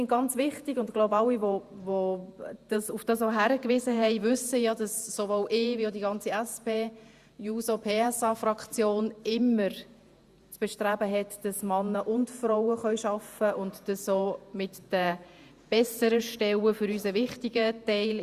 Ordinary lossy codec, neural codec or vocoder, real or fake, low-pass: Opus, 64 kbps; vocoder, 48 kHz, 128 mel bands, Vocos; fake; 14.4 kHz